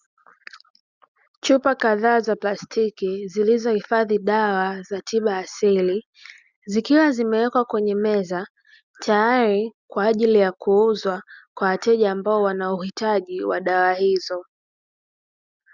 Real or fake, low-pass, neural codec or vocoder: real; 7.2 kHz; none